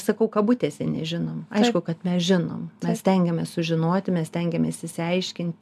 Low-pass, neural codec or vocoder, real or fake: 14.4 kHz; none; real